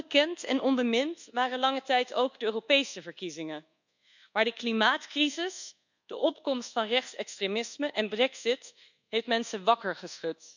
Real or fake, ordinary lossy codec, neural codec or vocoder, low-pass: fake; none; codec, 24 kHz, 1.2 kbps, DualCodec; 7.2 kHz